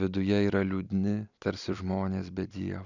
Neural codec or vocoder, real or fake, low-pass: vocoder, 44.1 kHz, 128 mel bands every 256 samples, BigVGAN v2; fake; 7.2 kHz